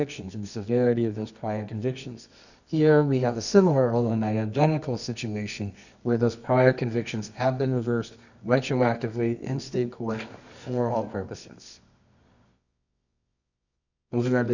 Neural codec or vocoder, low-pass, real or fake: codec, 24 kHz, 0.9 kbps, WavTokenizer, medium music audio release; 7.2 kHz; fake